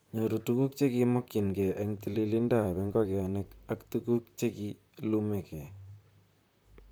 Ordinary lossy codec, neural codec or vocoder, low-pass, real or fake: none; vocoder, 44.1 kHz, 128 mel bands, Pupu-Vocoder; none; fake